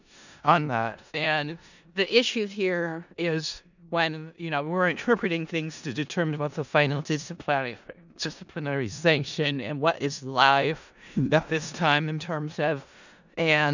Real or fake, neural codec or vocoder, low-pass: fake; codec, 16 kHz in and 24 kHz out, 0.4 kbps, LongCat-Audio-Codec, four codebook decoder; 7.2 kHz